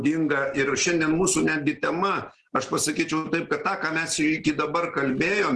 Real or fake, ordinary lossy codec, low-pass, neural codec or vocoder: real; Opus, 16 kbps; 10.8 kHz; none